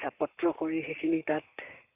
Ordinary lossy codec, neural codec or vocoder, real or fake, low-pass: none; codec, 44.1 kHz, 7.8 kbps, Pupu-Codec; fake; 3.6 kHz